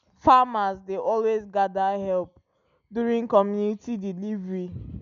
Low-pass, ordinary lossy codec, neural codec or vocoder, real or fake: 7.2 kHz; none; none; real